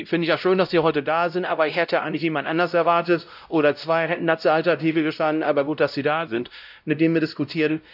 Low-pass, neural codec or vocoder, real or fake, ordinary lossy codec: 5.4 kHz; codec, 16 kHz, 0.5 kbps, X-Codec, WavLM features, trained on Multilingual LibriSpeech; fake; none